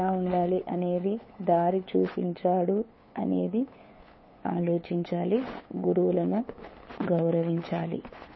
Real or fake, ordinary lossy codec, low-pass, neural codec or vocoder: fake; MP3, 24 kbps; 7.2 kHz; codec, 16 kHz, 8 kbps, FunCodec, trained on LibriTTS, 25 frames a second